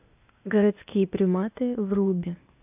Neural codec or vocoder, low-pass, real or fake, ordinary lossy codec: codec, 16 kHz, 0.8 kbps, ZipCodec; 3.6 kHz; fake; AAC, 32 kbps